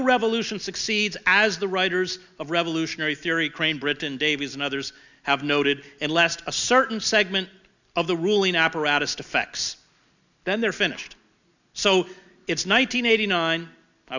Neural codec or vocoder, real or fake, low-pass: none; real; 7.2 kHz